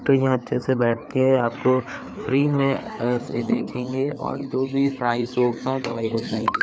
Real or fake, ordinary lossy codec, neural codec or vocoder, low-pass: fake; none; codec, 16 kHz, 4 kbps, FreqCodec, larger model; none